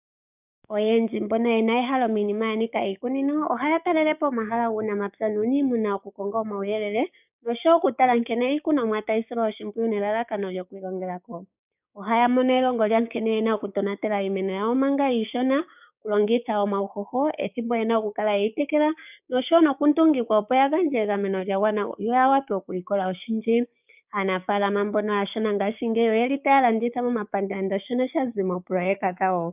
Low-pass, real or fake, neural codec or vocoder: 3.6 kHz; fake; codec, 16 kHz, 6 kbps, DAC